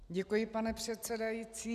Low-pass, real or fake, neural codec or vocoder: 14.4 kHz; real; none